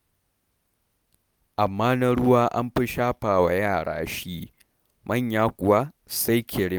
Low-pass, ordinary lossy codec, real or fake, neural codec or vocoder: none; none; real; none